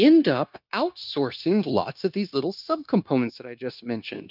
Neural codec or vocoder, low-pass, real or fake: codec, 16 kHz, 0.9 kbps, LongCat-Audio-Codec; 5.4 kHz; fake